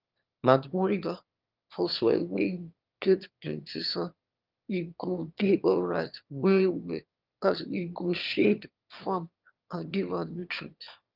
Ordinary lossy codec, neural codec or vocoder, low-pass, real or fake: Opus, 24 kbps; autoencoder, 22.05 kHz, a latent of 192 numbers a frame, VITS, trained on one speaker; 5.4 kHz; fake